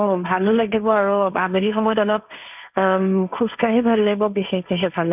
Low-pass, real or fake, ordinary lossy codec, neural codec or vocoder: 3.6 kHz; fake; none; codec, 16 kHz, 1.1 kbps, Voila-Tokenizer